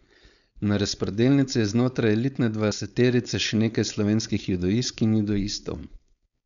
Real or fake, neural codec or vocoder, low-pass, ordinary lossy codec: fake; codec, 16 kHz, 4.8 kbps, FACodec; 7.2 kHz; none